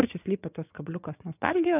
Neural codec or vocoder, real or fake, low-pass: vocoder, 24 kHz, 100 mel bands, Vocos; fake; 3.6 kHz